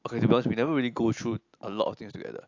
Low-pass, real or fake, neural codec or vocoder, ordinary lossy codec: 7.2 kHz; real; none; AAC, 48 kbps